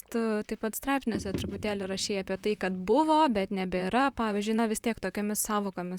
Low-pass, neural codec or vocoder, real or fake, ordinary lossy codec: 19.8 kHz; vocoder, 44.1 kHz, 128 mel bands, Pupu-Vocoder; fake; Opus, 64 kbps